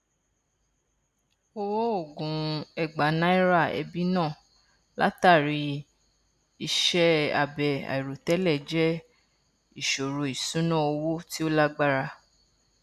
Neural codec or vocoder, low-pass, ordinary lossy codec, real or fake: none; 14.4 kHz; none; real